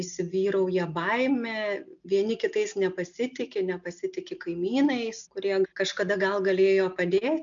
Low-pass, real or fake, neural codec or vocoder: 7.2 kHz; real; none